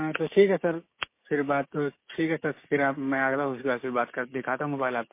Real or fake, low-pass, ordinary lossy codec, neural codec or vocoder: fake; 3.6 kHz; MP3, 24 kbps; codec, 16 kHz, 6 kbps, DAC